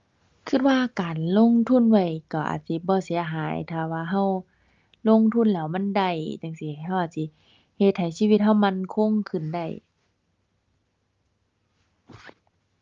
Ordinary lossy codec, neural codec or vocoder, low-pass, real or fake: Opus, 32 kbps; none; 7.2 kHz; real